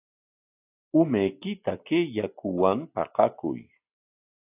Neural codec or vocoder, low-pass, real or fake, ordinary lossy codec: none; 3.6 kHz; real; AAC, 32 kbps